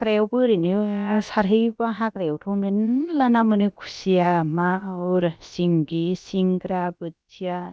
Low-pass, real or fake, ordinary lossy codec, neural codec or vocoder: none; fake; none; codec, 16 kHz, about 1 kbps, DyCAST, with the encoder's durations